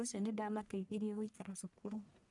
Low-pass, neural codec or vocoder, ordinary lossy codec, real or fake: 10.8 kHz; codec, 44.1 kHz, 1.7 kbps, Pupu-Codec; none; fake